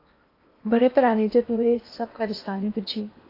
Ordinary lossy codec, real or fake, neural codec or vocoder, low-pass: AAC, 32 kbps; fake; codec, 16 kHz in and 24 kHz out, 0.8 kbps, FocalCodec, streaming, 65536 codes; 5.4 kHz